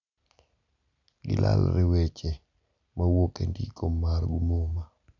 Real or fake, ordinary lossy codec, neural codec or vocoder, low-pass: real; none; none; 7.2 kHz